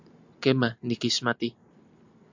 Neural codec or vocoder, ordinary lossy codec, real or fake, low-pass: none; MP3, 64 kbps; real; 7.2 kHz